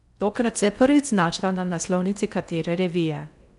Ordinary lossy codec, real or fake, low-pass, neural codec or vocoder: none; fake; 10.8 kHz; codec, 16 kHz in and 24 kHz out, 0.6 kbps, FocalCodec, streaming, 4096 codes